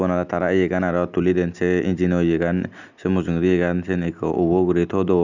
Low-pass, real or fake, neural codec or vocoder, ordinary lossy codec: 7.2 kHz; real; none; none